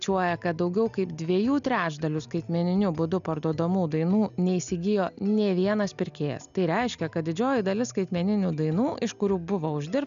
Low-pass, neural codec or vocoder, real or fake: 7.2 kHz; none; real